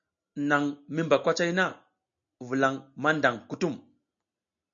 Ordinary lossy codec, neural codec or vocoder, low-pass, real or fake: MP3, 64 kbps; none; 7.2 kHz; real